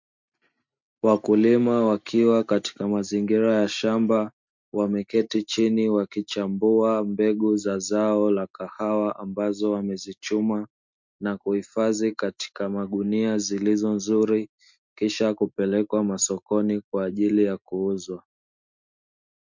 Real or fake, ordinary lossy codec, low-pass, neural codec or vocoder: real; MP3, 64 kbps; 7.2 kHz; none